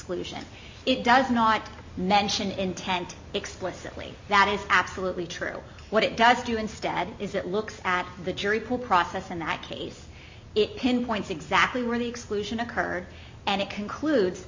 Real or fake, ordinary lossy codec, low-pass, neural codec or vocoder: real; MP3, 48 kbps; 7.2 kHz; none